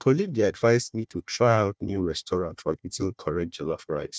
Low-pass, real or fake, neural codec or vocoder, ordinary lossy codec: none; fake; codec, 16 kHz, 1 kbps, FunCodec, trained on Chinese and English, 50 frames a second; none